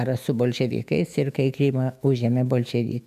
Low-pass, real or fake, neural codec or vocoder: 14.4 kHz; fake; autoencoder, 48 kHz, 128 numbers a frame, DAC-VAE, trained on Japanese speech